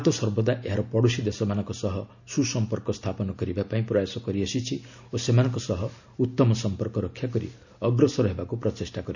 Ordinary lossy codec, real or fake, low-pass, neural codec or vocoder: none; real; 7.2 kHz; none